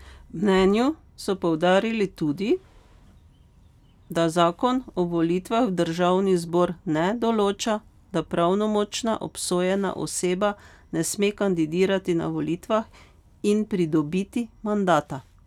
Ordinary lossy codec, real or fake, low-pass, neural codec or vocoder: none; real; 19.8 kHz; none